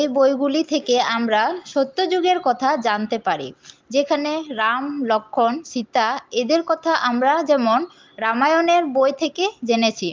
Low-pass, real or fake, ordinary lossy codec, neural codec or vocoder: 7.2 kHz; real; Opus, 24 kbps; none